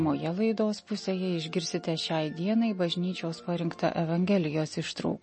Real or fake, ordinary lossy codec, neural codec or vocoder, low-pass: real; MP3, 32 kbps; none; 10.8 kHz